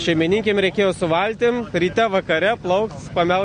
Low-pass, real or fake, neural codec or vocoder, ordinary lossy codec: 9.9 kHz; real; none; MP3, 48 kbps